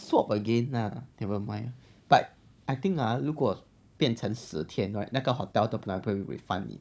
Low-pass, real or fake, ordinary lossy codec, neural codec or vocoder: none; fake; none; codec, 16 kHz, 16 kbps, FunCodec, trained on Chinese and English, 50 frames a second